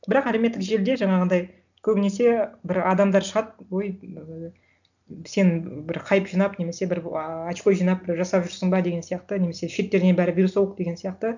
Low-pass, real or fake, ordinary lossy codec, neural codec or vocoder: 7.2 kHz; real; none; none